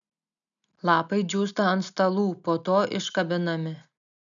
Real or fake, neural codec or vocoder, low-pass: real; none; 7.2 kHz